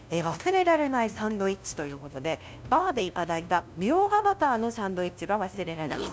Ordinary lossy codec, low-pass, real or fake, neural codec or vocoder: none; none; fake; codec, 16 kHz, 0.5 kbps, FunCodec, trained on LibriTTS, 25 frames a second